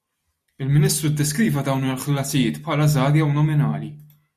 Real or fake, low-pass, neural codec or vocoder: real; 14.4 kHz; none